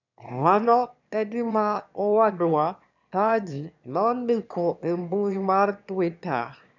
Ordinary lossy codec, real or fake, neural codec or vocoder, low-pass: none; fake; autoencoder, 22.05 kHz, a latent of 192 numbers a frame, VITS, trained on one speaker; 7.2 kHz